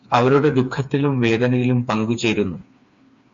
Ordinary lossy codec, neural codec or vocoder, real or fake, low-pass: MP3, 48 kbps; codec, 16 kHz, 4 kbps, FreqCodec, smaller model; fake; 7.2 kHz